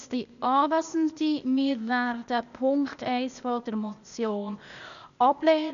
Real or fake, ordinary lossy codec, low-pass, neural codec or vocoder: fake; none; 7.2 kHz; codec, 16 kHz, 0.8 kbps, ZipCodec